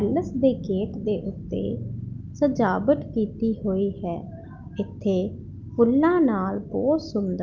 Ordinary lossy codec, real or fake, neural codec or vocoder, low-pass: Opus, 24 kbps; real; none; 7.2 kHz